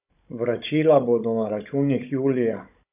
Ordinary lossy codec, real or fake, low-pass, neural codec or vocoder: none; fake; 3.6 kHz; codec, 16 kHz, 16 kbps, FunCodec, trained on Chinese and English, 50 frames a second